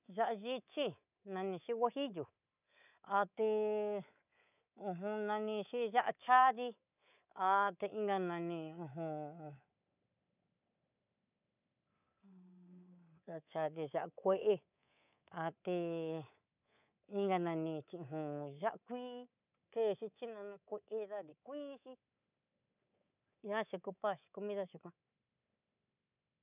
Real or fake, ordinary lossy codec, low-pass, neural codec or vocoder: fake; none; 3.6 kHz; codec, 24 kHz, 3.1 kbps, DualCodec